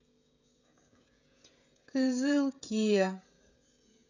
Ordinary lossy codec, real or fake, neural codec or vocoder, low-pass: none; fake; codec, 16 kHz, 16 kbps, FreqCodec, smaller model; 7.2 kHz